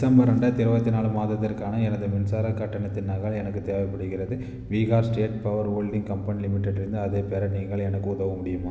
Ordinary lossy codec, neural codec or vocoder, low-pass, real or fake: none; none; none; real